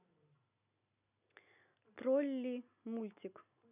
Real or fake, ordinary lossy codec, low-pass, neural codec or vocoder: real; none; 3.6 kHz; none